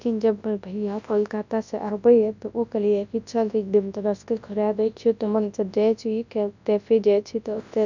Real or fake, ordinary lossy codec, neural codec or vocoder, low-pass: fake; none; codec, 24 kHz, 0.9 kbps, WavTokenizer, large speech release; 7.2 kHz